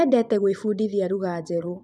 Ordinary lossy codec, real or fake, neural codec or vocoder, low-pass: none; fake; vocoder, 24 kHz, 100 mel bands, Vocos; none